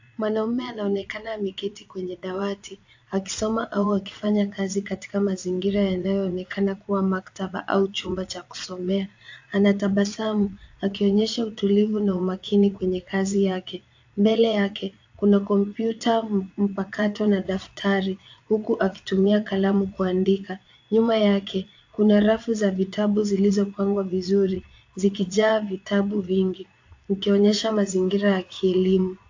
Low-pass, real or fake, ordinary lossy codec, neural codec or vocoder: 7.2 kHz; fake; AAC, 48 kbps; vocoder, 22.05 kHz, 80 mel bands, Vocos